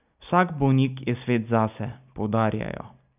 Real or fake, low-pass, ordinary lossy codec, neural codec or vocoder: real; 3.6 kHz; none; none